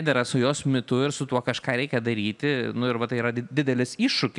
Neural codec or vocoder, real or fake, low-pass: none; real; 10.8 kHz